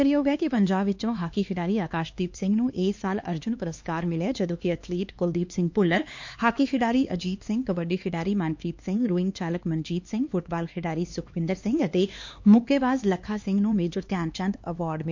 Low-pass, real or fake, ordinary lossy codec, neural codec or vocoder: 7.2 kHz; fake; MP3, 48 kbps; codec, 16 kHz, 2 kbps, X-Codec, HuBERT features, trained on LibriSpeech